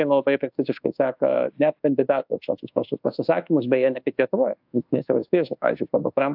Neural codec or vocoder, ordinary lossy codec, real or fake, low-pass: codec, 24 kHz, 1.2 kbps, DualCodec; AAC, 48 kbps; fake; 5.4 kHz